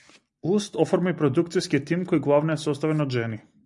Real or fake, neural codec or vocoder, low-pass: real; none; 10.8 kHz